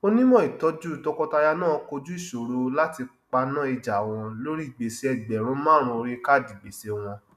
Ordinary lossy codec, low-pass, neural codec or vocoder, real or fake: none; 14.4 kHz; none; real